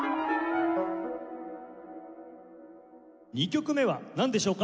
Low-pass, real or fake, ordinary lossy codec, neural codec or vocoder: none; real; none; none